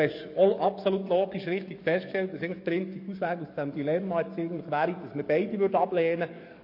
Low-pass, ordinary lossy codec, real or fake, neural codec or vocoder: 5.4 kHz; MP3, 32 kbps; fake; codec, 44.1 kHz, 7.8 kbps, DAC